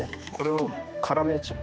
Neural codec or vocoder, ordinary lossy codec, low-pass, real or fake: codec, 16 kHz, 2 kbps, X-Codec, HuBERT features, trained on general audio; none; none; fake